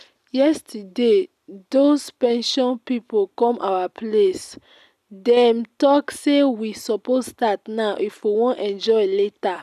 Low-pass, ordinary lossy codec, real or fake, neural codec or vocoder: 14.4 kHz; none; real; none